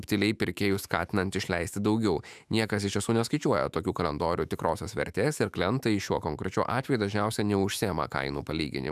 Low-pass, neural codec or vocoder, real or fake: 14.4 kHz; autoencoder, 48 kHz, 128 numbers a frame, DAC-VAE, trained on Japanese speech; fake